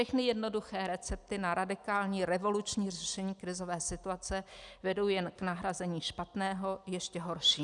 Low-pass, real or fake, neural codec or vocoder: 10.8 kHz; real; none